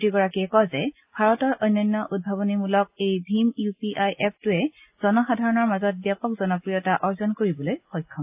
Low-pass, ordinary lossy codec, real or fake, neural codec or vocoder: 3.6 kHz; AAC, 32 kbps; real; none